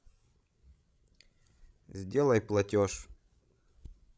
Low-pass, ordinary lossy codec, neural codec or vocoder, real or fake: none; none; codec, 16 kHz, 16 kbps, FreqCodec, larger model; fake